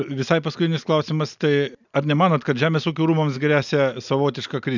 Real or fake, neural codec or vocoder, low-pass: real; none; 7.2 kHz